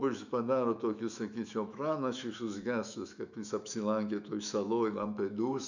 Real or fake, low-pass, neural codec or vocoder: fake; 7.2 kHz; autoencoder, 48 kHz, 128 numbers a frame, DAC-VAE, trained on Japanese speech